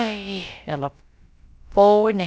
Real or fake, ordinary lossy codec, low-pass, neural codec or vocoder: fake; none; none; codec, 16 kHz, about 1 kbps, DyCAST, with the encoder's durations